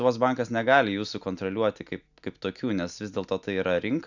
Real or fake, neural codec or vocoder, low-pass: real; none; 7.2 kHz